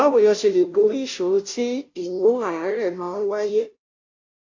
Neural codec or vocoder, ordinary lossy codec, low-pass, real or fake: codec, 16 kHz, 0.5 kbps, FunCodec, trained on Chinese and English, 25 frames a second; AAC, 48 kbps; 7.2 kHz; fake